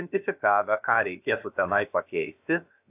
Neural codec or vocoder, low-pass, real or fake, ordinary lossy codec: codec, 16 kHz, about 1 kbps, DyCAST, with the encoder's durations; 3.6 kHz; fake; AAC, 32 kbps